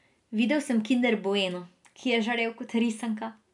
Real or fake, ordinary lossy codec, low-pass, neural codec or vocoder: real; none; 10.8 kHz; none